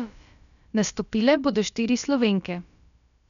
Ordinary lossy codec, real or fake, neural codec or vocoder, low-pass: none; fake; codec, 16 kHz, about 1 kbps, DyCAST, with the encoder's durations; 7.2 kHz